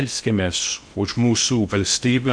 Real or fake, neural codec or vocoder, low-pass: fake; codec, 16 kHz in and 24 kHz out, 0.6 kbps, FocalCodec, streaming, 2048 codes; 9.9 kHz